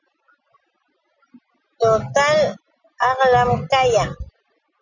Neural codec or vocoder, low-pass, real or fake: none; 7.2 kHz; real